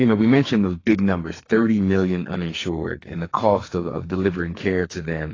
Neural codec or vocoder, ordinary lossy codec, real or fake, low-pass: codec, 44.1 kHz, 2.6 kbps, SNAC; AAC, 32 kbps; fake; 7.2 kHz